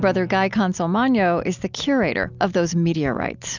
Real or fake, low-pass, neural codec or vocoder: real; 7.2 kHz; none